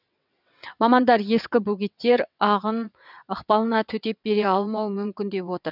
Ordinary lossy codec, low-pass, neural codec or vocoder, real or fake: none; 5.4 kHz; vocoder, 22.05 kHz, 80 mel bands, WaveNeXt; fake